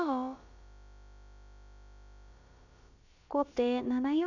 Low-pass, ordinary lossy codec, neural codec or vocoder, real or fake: 7.2 kHz; none; codec, 16 kHz, about 1 kbps, DyCAST, with the encoder's durations; fake